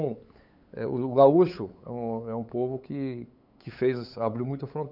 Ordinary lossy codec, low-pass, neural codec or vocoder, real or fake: none; 5.4 kHz; codec, 16 kHz, 8 kbps, FunCodec, trained on LibriTTS, 25 frames a second; fake